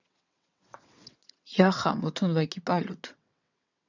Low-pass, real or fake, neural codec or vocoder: 7.2 kHz; fake; vocoder, 22.05 kHz, 80 mel bands, WaveNeXt